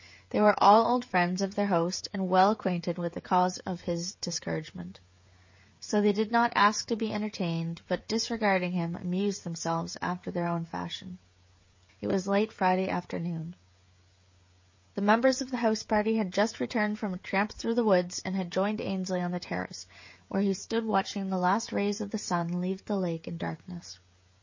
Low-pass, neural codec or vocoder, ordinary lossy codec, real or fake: 7.2 kHz; codec, 16 kHz, 16 kbps, FreqCodec, smaller model; MP3, 32 kbps; fake